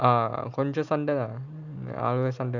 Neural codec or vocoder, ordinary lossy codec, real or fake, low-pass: none; none; real; 7.2 kHz